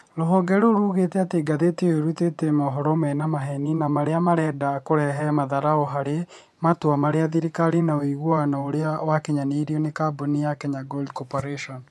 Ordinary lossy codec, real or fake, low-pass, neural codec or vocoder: none; fake; none; vocoder, 24 kHz, 100 mel bands, Vocos